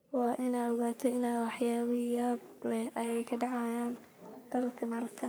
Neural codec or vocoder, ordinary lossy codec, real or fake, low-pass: codec, 44.1 kHz, 3.4 kbps, Pupu-Codec; none; fake; none